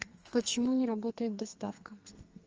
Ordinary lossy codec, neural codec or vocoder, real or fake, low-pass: Opus, 24 kbps; codec, 32 kHz, 1.9 kbps, SNAC; fake; 7.2 kHz